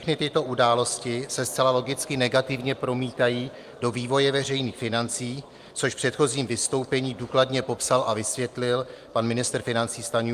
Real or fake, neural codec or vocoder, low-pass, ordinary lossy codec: real; none; 14.4 kHz; Opus, 32 kbps